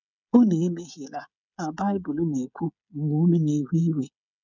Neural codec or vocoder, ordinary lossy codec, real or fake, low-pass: codec, 16 kHz, 16 kbps, FreqCodec, smaller model; none; fake; 7.2 kHz